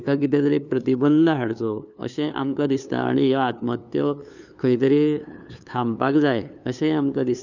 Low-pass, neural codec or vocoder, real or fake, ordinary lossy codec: 7.2 kHz; codec, 16 kHz, 2 kbps, FunCodec, trained on LibriTTS, 25 frames a second; fake; none